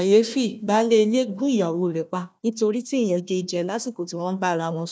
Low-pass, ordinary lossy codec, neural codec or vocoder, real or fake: none; none; codec, 16 kHz, 1 kbps, FunCodec, trained on Chinese and English, 50 frames a second; fake